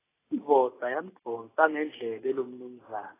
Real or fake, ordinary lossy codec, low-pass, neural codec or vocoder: real; AAC, 16 kbps; 3.6 kHz; none